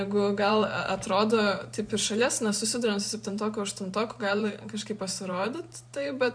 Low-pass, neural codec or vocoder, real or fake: 9.9 kHz; vocoder, 44.1 kHz, 128 mel bands every 512 samples, BigVGAN v2; fake